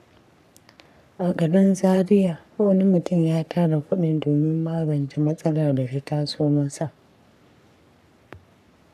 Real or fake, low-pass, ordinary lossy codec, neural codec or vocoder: fake; 14.4 kHz; none; codec, 44.1 kHz, 3.4 kbps, Pupu-Codec